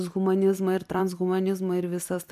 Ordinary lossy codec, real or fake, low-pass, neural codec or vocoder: AAC, 96 kbps; fake; 14.4 kHz; vocoder, 44.1 kHz, 128 mel bands every 256 samples, BigVGAN v2